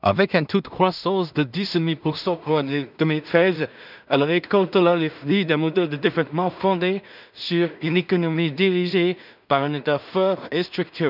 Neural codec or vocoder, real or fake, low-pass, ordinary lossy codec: codec, 16 kHz in and 24 kHz out, 0.4 kbps, LongCat-Audio-Codec, two codebook decoder; fake; 5.4 kHz; none